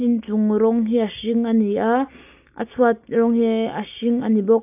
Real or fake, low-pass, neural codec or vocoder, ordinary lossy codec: real; 3.6 kHz; none; AAC, 32 kbps